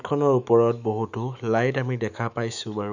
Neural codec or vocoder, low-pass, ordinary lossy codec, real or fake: autoencoder, 48 kHz, 128 numbers a frame, DAC-VAE, trained on Japanese speech; 7.2 kHz; none; fake